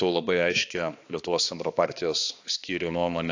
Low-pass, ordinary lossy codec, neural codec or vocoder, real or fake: 7.2 kHz; AAC, 48 kbps; codec, 16 kHz, 2 kbps, X-Codec, HuBERT features, trained on balanced general audio; fake